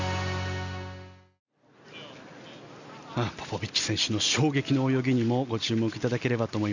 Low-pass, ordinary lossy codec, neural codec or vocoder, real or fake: 7.2 kHz; none; none; real